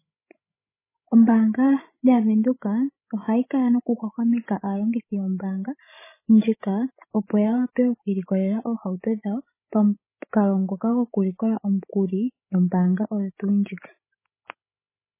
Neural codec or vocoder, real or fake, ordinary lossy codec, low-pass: codec, 16 kHz, 16 kbps, FreqCodec, larger model; fake; MP3, 16 kbps; 3.6 kHz